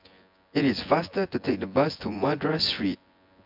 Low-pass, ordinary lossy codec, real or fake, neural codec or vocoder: 5.4 kHz; MP3, 48 kbps; fake; vocoder, 24 kHz, 100 mel bands, Vocos